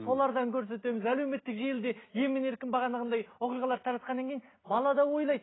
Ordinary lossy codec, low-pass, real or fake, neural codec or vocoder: AAC, 16 kbps; 7.2 kHz; real; none